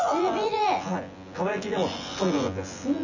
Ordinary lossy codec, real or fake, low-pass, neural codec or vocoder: none; fake; 7.2 kHz; vocoder, 24 kHz, 100 mel bands, Vocos